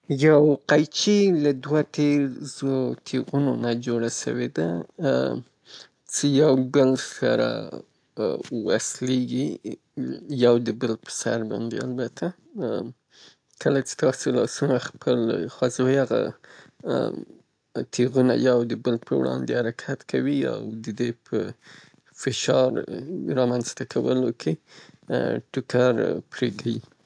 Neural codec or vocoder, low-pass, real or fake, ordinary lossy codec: none; 9.9 kHz; real; AAC, 64 kbps